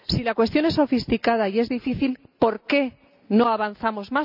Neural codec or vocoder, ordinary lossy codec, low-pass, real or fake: none; none; 5.4 kHz; real